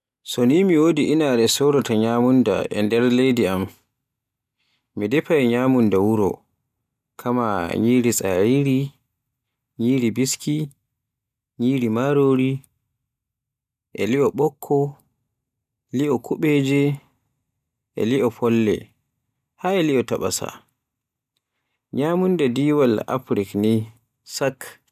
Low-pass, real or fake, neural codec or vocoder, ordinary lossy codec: 14.4 kHz; real; none; none